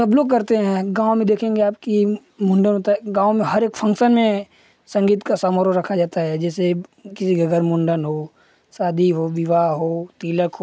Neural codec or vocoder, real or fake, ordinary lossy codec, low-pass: none; real; none; none